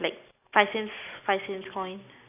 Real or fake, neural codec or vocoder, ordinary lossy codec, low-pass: real; none; none; 3.6 kHz